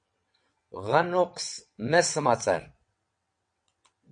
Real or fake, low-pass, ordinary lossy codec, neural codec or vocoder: fake; 9.9 kHz; MP3, 48 kbps; vocoder, 22.05 kHz, 80 mel bands, WaveNeXt